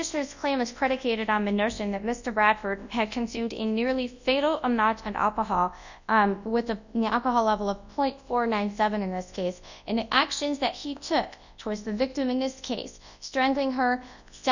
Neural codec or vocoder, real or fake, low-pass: codec, 24 kHz, 0.9 kbps, WavTokenizer, large speech release; fake; 7.2 kHz